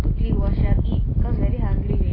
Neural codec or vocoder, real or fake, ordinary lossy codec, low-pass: none; real; AAC, 24 kbps; 5.4 kHz